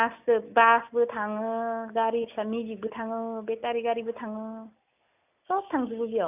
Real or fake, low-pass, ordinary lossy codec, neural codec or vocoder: real; 3.6 kHz; none; none